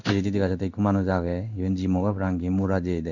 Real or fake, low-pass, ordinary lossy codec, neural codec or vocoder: fake; 7.2 kHz; none; codec, 16 kHz in and 24 kHz out, 1 kbps, XY-Tokenizer